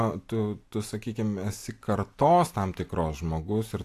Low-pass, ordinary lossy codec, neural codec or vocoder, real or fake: 14.4 kHz; AAC, 64 kbps; none; real